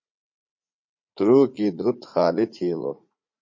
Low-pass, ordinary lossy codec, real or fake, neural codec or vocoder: 7.2 kHz; MP3, 32 kbps; fake; vocoder, 44.1 kHz, 80 mel bands, Vocos